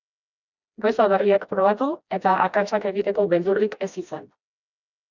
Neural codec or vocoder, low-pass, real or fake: codec, 16 kHz, 1 kbps, FreqCodec, smaller model; 7.2 kHz; fake